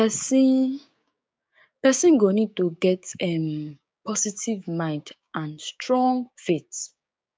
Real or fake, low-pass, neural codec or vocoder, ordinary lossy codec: fake; none; codec, 16 kHz, 6 kbps, DAC; none